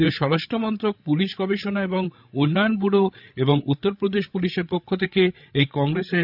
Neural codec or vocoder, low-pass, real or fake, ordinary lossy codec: codec, 16 kHz, 8 kbps, FreqCodec, larger model; 5.4 kHz; fake; none